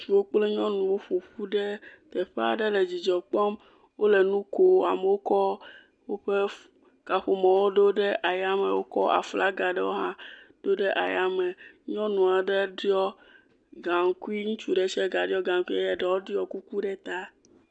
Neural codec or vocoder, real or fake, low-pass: none; real; 9.9 kHz